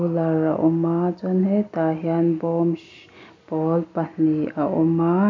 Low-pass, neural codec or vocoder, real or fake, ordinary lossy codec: 7.2 kHz; none; real; MP3, 48 kbps